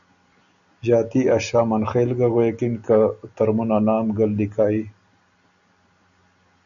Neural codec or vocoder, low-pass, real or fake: none; 7.2 kHz; real